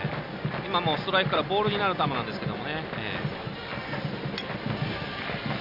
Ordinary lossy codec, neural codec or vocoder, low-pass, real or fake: none; none; 5.4 kHz; real